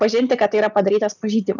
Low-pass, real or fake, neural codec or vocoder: 7.2 kHz; real; none